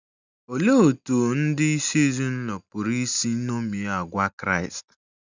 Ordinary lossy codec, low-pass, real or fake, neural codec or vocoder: none; 7.2 kHz; real; none